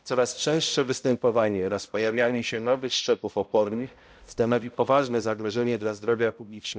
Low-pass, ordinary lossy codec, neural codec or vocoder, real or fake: none; none; codec, 16 kHz, 0.5 kbps, X-Codec, HuBERT features, trained on balanced general audio; fake